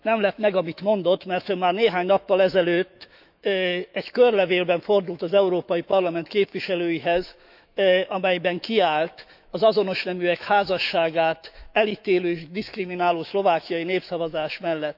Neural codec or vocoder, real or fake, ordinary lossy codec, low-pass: autoencoder, 48 kHz, 128 numbers a frame, DAC-VAE, trained on Japanese speech; fake; none; 5.4 kHz